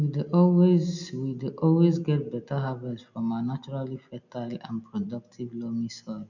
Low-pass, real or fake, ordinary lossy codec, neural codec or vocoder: 7.2 kHz; real; none; none